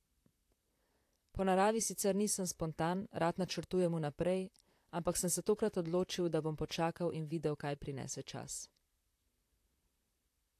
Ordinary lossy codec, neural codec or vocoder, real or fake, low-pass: AAC, 64 kbps; vocoder, 44.1 kHz, 128 mel bands, Pupu-Vocoder; fake; 14.4 kHz